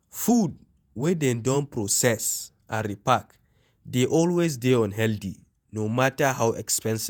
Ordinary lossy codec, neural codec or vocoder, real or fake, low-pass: none; vocoder, 48 kHz, 128 mel bands, Vocos; fake; none